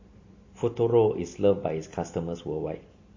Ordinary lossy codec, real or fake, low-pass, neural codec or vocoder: MP3, 32 kbps; real; 7.2 kHz; none